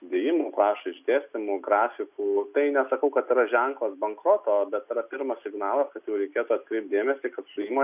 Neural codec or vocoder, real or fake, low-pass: none; real; 3.6 kHz